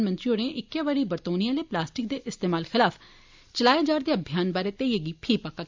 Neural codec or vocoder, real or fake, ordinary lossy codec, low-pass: none; real; MP3, 64 kbps; 7.2 kHz